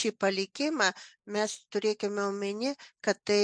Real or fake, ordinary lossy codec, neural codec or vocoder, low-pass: real; MP3, 64 kbps; none; 9.9 kHz